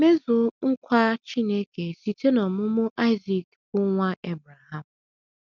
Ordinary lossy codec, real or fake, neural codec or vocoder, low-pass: none; real; none; 7.2 kHz